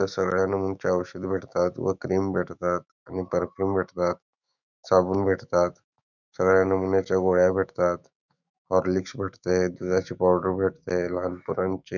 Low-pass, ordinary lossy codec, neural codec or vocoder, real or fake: 7.2 kHz; none; autoencoder, 48 kHz, 128 numbers a frame, DAC-VAE, trained on Japanese speech; fake